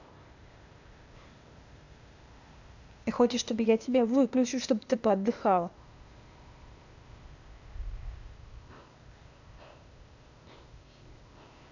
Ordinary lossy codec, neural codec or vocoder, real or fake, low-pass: none; codec, 16 kHz, 0.8 kbps, ZipCodec; fake; 7.2 kHz